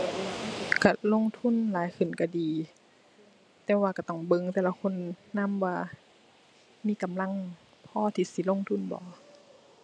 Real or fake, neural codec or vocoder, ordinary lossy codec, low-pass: real; none; none; none